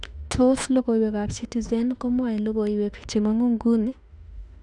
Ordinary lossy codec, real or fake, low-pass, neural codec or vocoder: none; fake; 10.8 kHz; autoencoder, 48 kHz, 32 numbers a frame, DAC-VAE, trained on Japanese speech